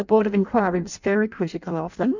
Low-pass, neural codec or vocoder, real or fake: 7.2 kHz; codec, 16 kHz in and 24 kHz out, 0.6 kbps, FireRedTTS-2 codec; fake